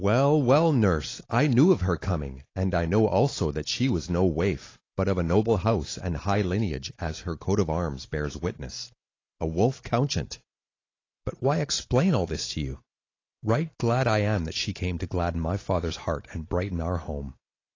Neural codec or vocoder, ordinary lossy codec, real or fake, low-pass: none; AAC, 32 kbps; real; 7.2 kHz